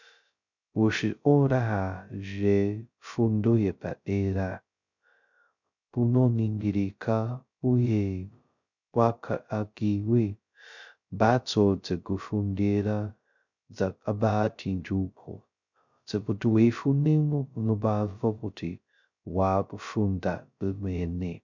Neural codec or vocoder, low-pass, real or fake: codec, 16 kHz, 0.2 kbps, FocalCodec; 7.2 kHz; fake